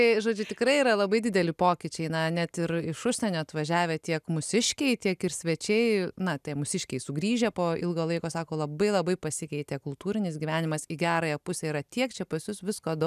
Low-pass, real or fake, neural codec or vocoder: 14.4 kHz; real; none